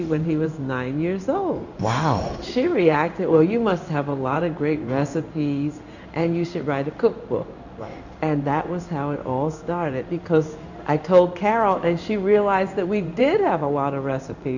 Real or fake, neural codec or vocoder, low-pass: fake; codec, 16 kHz in and 24 kHz out, 1 kbps, XY-Tokenizer; 7.2 kHz